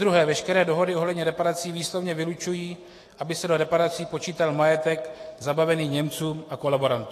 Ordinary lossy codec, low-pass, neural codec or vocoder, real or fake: AAC, 48 kbps; 14.4 kHz; autoencoder, 48 kHz, 128 numbers a frame, DAC-VAE, trained on Japanese speech; fake